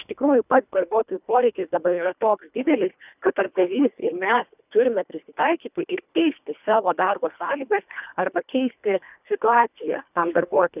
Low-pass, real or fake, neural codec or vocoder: 3.6 kHz; fake; codec, 24 kHz, 1.5 kbps, HILCodec